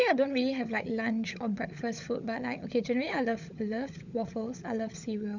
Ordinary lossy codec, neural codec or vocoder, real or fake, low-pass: none; codec, 16 kHz, 16 kbps, FunCodec, trained on LibriTTS, 50 frames a second; fake; 7.2 kHz